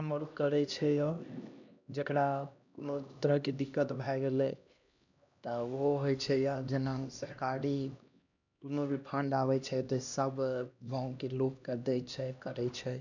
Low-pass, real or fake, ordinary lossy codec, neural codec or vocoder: 7.2 kHz; fake; none; codec, 16 kHz, 1 kbps, X-Codec, HuBERT features, trained on LibriSpeech